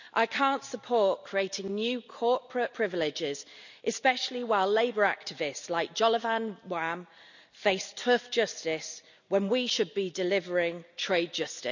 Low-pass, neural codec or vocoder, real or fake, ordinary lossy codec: 7.2 kHz; none; real; none